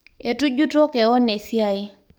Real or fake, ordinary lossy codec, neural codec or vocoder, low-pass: fake; none; codec, 44.1 kHz, 7.8 kbps, DAC; none